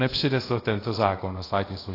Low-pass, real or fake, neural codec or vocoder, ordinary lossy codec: 5.4 kHz; fake; codec, 24 kHz, 0.5 kbps, DualCodec; AAC, 24 kbps